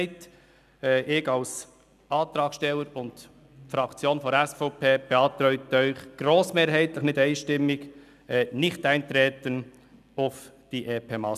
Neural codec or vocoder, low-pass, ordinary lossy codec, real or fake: none; 14.4 kHz; none; real